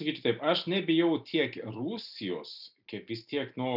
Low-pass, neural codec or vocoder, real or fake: 5.4 kHz; none; real